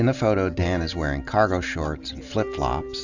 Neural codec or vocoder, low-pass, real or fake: none; 7.2 kHz; real